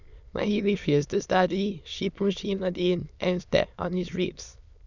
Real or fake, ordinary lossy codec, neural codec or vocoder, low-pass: fake; none; autoencoder, 22.05 kHz, a latent of 192 numbers a frame, VITS, trained on many speakers; 7.2 kHz